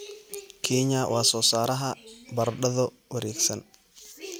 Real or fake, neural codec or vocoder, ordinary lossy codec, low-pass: real; none; none; none